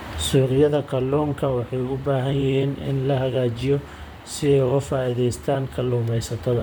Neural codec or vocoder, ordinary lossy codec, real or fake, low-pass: vocoder, 44.1 kHz, 128 mel bands, Pupu-Vocoder; none; fake; none